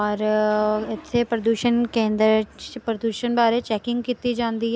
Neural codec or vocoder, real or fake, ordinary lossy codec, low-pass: none; real; none; none